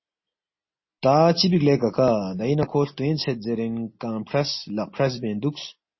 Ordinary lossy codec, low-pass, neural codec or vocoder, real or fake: MP3, 24 kbps; 7.2 kHz; none; real